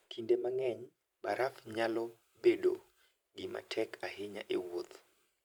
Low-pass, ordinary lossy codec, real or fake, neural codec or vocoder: none; none; fake; vocoder, 44.1 kHz, 128 mel bands every 512 samples, BigVGAN v2